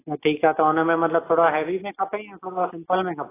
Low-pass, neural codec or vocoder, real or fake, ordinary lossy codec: 3.6 kHz; none; real; AAC, 16 kbps